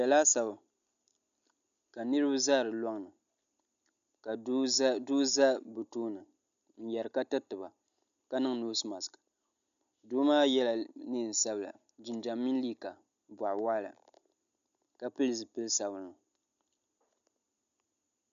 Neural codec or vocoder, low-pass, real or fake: none; 7.2 kHz; real